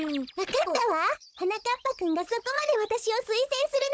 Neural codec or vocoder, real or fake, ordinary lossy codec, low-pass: codec, 16 kHz, 16 kbps, FunCodec, trained on Chinese and English, 50 frames a second; fake; none; none